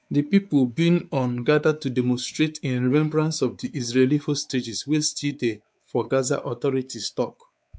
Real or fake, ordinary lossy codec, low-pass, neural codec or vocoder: fake; none; none; codec, 16 kHz, 4 kbps, X-Codec, WavLM features, trained on Multilingual LibriSpeech